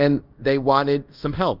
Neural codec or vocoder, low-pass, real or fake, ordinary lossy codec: codec, 24 kHz, 0.5 kbps, DualCodec; 5.4 kHz; fake; Opus, 32 kbps